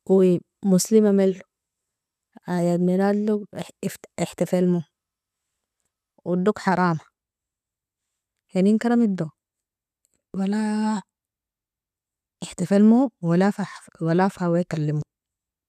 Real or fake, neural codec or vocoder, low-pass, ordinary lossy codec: real; none; 14.4 kHz; none